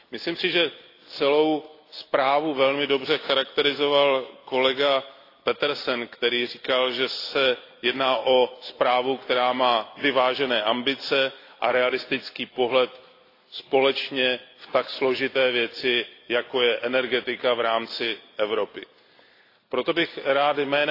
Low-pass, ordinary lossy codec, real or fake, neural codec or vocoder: 5.4 kHz; AAC, 32 kbps; real; none